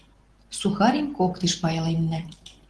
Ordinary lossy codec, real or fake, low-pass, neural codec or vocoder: Opus, 16 kbps; real; 10.8 kHz; none